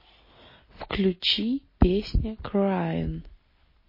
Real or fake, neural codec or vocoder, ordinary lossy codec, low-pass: real; none; MP3, 24 kbps; 5.4 kHz